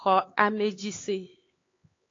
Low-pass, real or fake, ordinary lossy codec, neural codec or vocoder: 7.2 kHz; fake; AAC, 32 kbps; codec, 16 kHz, 4 kbps, X-Codec, HuBERT features, trained on LibriSpeech